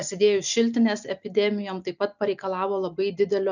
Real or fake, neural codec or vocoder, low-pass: real; none; 7.2 kHz